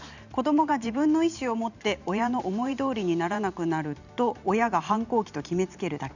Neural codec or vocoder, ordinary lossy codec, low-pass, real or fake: vocoder, 44.1 kHz, 128 mel bands every 512 samples, BigVGAN v2; none; 7.2 kHz; fake